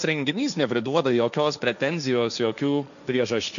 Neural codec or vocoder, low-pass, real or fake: codec, 16 kHz, 1.1 kbps, Voila-Tokenizer; 7.2 kHz; fake